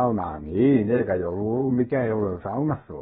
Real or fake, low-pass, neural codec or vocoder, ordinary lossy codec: fake; 7.2 kHz; codec, 16 kHz, about 1 kbps, DyCAST, with the encoder's durations; AAC, 16 kbps